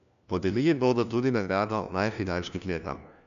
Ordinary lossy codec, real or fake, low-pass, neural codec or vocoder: none; fake; 7.2 kHz; codec, 16 kHz, 1 kbps, FunCodec, trained on LibriTTS, 50 frames a second